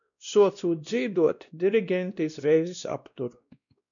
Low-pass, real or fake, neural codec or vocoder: 7.2 kHz; fake; codec, 16 kHz, 1 kbps, X-Codec, WavLM features, trained on Multilingual LibriSpeech